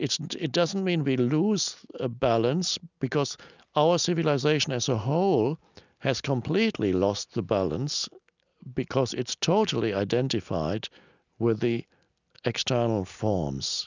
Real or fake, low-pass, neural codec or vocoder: real; 7.2 kHz; none